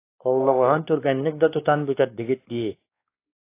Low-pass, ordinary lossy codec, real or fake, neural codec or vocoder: 3.6 kHz; AAC, 24 kbps; fake; codec, 16 kHz, 2 kbps, X-Codec, WavLM features, trained on Multilingual LibriSpeech